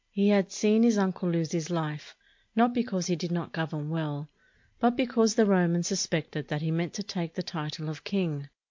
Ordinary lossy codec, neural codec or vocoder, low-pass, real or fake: MP3, 48 kbps; none; 7.2 kHz; real